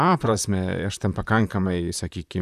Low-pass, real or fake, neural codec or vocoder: 14.4 kHz; fake; vocoder, 44.1 kHz, 128 mel bands, Pupu-Vocoder